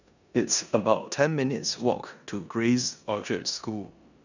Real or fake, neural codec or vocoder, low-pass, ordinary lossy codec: fake; codec, 16 kHz in and 24 kHz out, 0.9 kbps, LongCat-Audio-Codec, four codebook decoder; 7.2 kHz; none